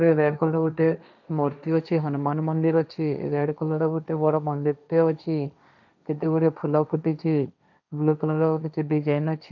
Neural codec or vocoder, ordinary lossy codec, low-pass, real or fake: codec, 16 kHz, 1.1 kbps, Voila-Tokenizer; none; 7.2 kHz; fake